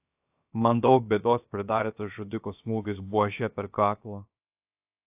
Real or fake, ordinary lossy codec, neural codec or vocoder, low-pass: fake; AAC, 32 kbps; codec, 16 kHz, 0.7 kbps, FocalCodec; 3.6 kHz